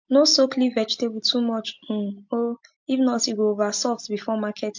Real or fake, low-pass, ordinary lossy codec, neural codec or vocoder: real; 7.2 kHz; MP3, 48 kbps; none